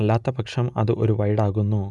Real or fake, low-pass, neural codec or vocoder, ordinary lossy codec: real; 10.8 kHz; none; none